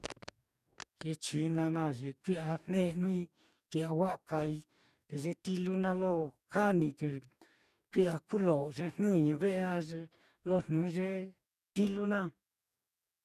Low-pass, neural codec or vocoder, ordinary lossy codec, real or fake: 14.4 kHz; codec, 44.1 kHz, 2.6 kbps, DAC; none; fake